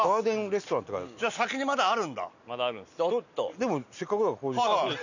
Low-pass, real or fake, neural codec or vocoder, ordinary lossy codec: 7.2 kHz; real; none; none